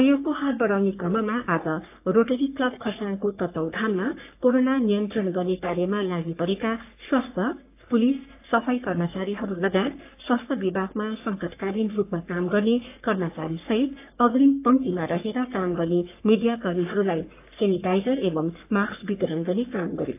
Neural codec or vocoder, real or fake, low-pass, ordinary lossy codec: codec, 44.1 kHz, 3.4 kbps, Pupu-Codec; fake; 3.6 kHz; AAC, 32 kbps